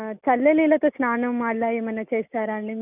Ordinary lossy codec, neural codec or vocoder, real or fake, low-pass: none; none; real; 3.6 kHz